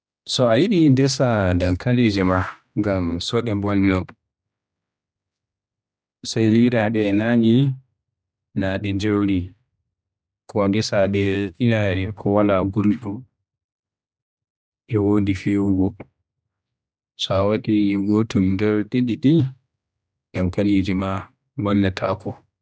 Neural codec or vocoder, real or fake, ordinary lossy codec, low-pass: codec, 16 kHz, 1 kbps, X-Codec, HuBERT features, trained on general audio; fake; none; none